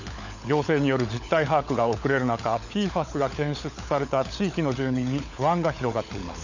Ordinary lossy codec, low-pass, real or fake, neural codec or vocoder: none; 7.2 kHz; fake; codec, 16 kHz, 16 kbps, FunCodec, trained on LibriTTS, 50 frames a second